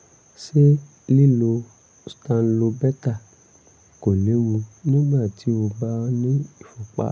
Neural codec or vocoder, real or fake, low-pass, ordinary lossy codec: none; real; none; none